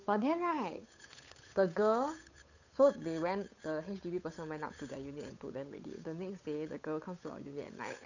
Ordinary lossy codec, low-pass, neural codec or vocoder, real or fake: none; 7.2 kHz; codec, 16 kHz, 8 kbps, FunCodec, trained on Chinese and English, 25 frames a second; fake